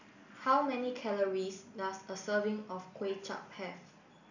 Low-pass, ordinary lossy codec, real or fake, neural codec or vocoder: 7.2 kHz; none; real; none